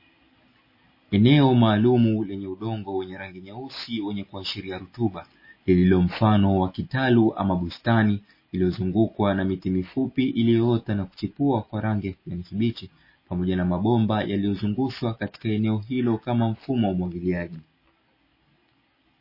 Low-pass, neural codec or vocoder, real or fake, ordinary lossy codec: 5.4 kHz; none; real; MP3, 24 kbps